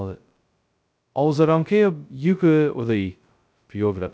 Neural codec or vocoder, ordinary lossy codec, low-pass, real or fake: codec, 16 kHz, 0.2 kbps, FocalCodec; none; none; fake